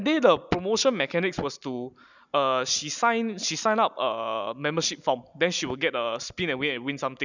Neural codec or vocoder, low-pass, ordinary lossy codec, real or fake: none; 7.2 kHz; none; real